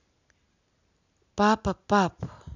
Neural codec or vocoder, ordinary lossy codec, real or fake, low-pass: none; none; real; 7.2 kHz